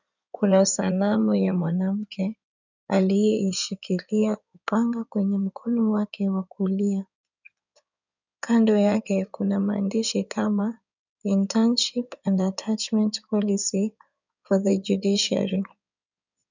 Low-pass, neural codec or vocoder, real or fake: 7.2 kHz; codec, 16 kHz in and 24 kHz out, 2.2 kbps, FireRedTTS-2 codec; fake